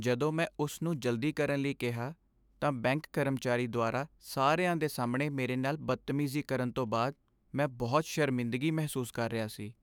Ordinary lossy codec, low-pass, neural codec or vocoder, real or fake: none; none; autoencoder, 48 kHz, 128 numbers a frame, DAC-VAE, trained on Japanese speech; fake